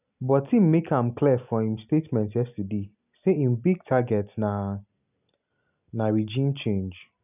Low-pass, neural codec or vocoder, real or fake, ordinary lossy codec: 3.6 kHz; none; real; none